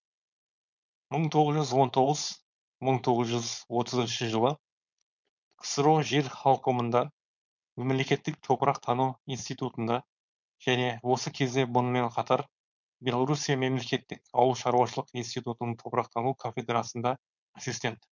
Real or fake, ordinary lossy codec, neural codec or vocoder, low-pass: fake; none; codec, 16 kHz, 4.8 kbps, FACodec; 7.2 kHz